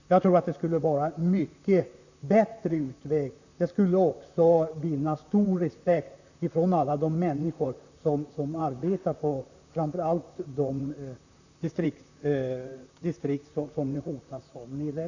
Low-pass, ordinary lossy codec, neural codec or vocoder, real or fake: 7.2 kHz; none; vocoder, 44.1 kHz, 128 mel bands, Pupu-Vocoder; fake